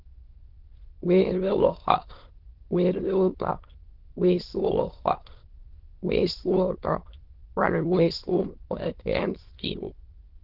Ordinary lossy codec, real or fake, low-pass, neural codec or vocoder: Opus, 16 kbps; fake; 5.4 kHz; autoencoder, 22.05 kHz, a latent of 192 numbers a frame, VITS, trained on many speakers